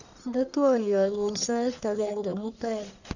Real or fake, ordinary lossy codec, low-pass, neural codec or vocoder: fake; none; 7.2 kHz; codec, 44.1 kHz, 1.7 kbps, Pupu-Codec